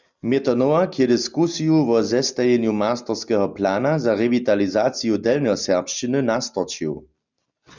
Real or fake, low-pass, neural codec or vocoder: real; 7.2 kHz; none